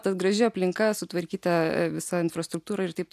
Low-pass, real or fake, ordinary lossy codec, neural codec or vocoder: 14.4 kHz; real; MP3, 96 kbps; none